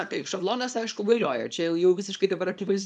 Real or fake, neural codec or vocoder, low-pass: fake; codec, 24 kHz, 0.9 kbps, WavTokenizer, small release; 10.8 kHz